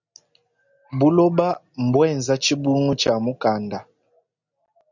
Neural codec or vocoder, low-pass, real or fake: none; 7.2 kHz; real